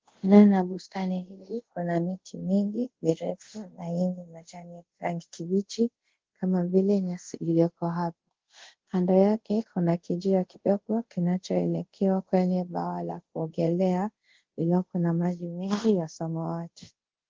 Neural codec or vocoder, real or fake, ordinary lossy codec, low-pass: codec, 24 kHz, 0.5 kbps, DualCodec; fake; Opus, 16 kbps; 7.2 kHz